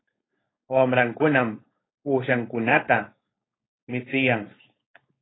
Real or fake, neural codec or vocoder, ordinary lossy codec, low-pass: fake; codec, 16 kHz, 4.8 kbps, FACodec; AAC, 16 kbps; 7.2 kHz